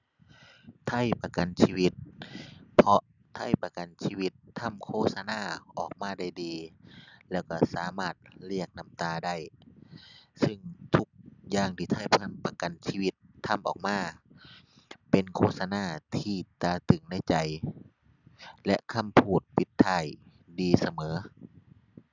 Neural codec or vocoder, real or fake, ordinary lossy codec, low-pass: none; real; none; 7.2 kHz